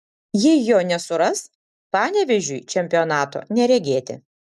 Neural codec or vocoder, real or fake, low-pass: none; real; 14.4 kHz